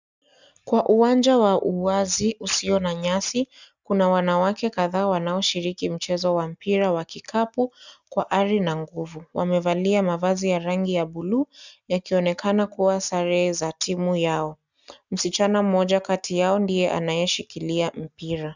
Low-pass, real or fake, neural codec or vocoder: 7.2 kHz; real; none